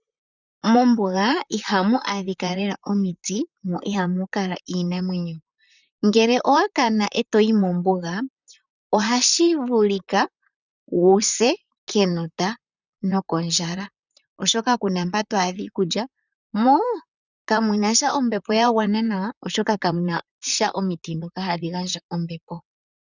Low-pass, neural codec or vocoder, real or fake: 7.2 kHz; vocoder, 44.1 kHz, 128 mel bands, Pupu-Vocoder; fake